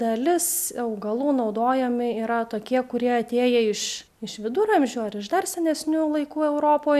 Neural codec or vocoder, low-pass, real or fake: none; 14.4 kHz; real